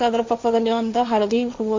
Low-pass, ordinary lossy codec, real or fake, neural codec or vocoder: 7.2 kHz; MP3, 64 kbps; fake; codec, 16 kHz, 1.1 kbps, Voila-Tokenizer